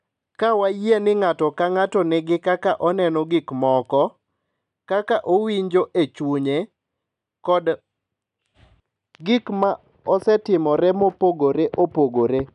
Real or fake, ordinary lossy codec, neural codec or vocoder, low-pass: real; none; none; 10.8 kHz